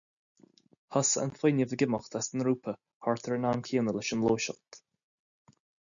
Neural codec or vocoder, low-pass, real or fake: none; 7.2 kHz; real